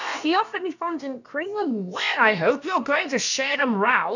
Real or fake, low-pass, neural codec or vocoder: fake; 7.2 kHz; codec, 16 kHz, about 1 kbps, DyCAST, with the encoder's durations